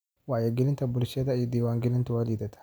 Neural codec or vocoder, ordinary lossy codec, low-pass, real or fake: none; none; none; real